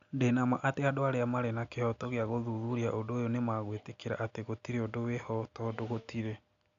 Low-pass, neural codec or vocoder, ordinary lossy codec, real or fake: 7.2 kHz; none; none; real